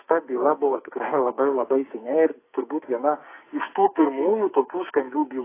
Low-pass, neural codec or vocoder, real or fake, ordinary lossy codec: 3.6 kHz; codec, 44.1 kHz, 2.6 kbps, SNAC; fake; AAC, 24 kbps